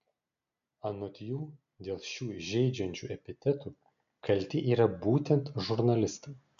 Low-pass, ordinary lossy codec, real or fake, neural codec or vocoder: 7.2 kHz; MP3, 96 kbps; real; none